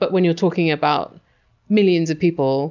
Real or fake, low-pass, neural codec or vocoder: real; 7.2 kHz; none